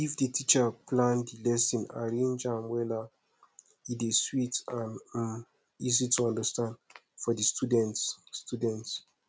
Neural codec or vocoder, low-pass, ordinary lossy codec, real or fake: none; none; none; real